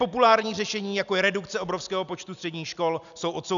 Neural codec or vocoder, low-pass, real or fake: none; 7.2 kHz; real